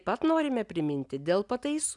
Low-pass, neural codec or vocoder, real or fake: 10.8 kHz; none; real